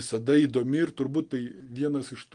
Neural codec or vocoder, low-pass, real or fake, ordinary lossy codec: none; 9.9 kHz; real; Opus, 24 kbps